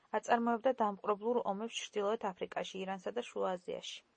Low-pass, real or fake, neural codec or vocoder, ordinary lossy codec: 9.9 kHz; real; none; MP3, 32 kbps